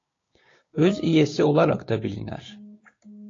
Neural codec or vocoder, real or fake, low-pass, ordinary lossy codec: codec, 16 kHz, 6 kbps, DAC; fake; 7.2 kHz; AAC, 32 kbps